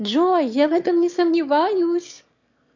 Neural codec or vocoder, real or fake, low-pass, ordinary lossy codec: autoencoder, 22.05 kHz, a latent of 192 numbers a frame, VITS, trained on one speaker; fake; 7.2 kHz; MP3, 64 kbps